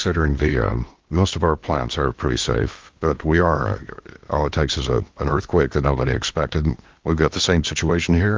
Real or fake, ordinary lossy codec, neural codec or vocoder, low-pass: fake; Opus, 32 kbps; codec, 16 kHz in and 24 kHz out, 0.8 kbps, FocalCodec, streaming, 65536 codes; 7.2 kHz